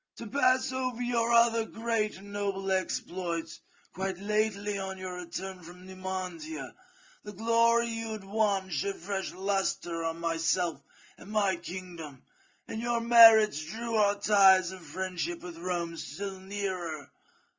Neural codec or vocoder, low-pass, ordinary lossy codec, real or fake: none; 7.2 kHz; Opus, 32 kbps; real